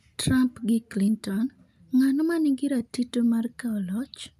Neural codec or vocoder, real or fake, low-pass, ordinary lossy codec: none; real; 14.4 kHz; none